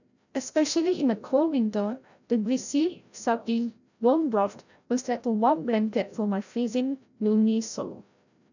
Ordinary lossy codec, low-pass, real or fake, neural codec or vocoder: none; 7.2 kHz; fake; codec, 16 kHz, 0.5 kbps, FreqCodec, larger model